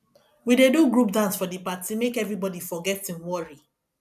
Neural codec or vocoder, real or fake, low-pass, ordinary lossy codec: none; real; 14.4 kHz; none